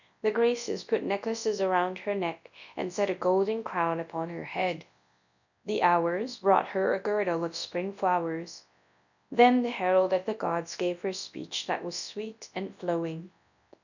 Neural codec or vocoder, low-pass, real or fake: codec, 24 kHz, 0.9 kbps, WavTokenizer, large speech release; 7.2 kHz; fake